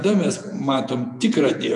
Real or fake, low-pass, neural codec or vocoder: fake; 10.8 kHz; vocoder, 48 kHz, 128 mel bands, Vocos